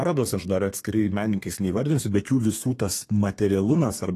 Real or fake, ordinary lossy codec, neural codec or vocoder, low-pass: fake; AAC, 48 kbps; codec, 32 kHz, 1.9 kbps, SNAC; 14.4 kHz